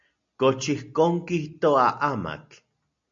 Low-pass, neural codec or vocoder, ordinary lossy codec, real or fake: 7.2 kHz; none; MP3, 48 kbps; real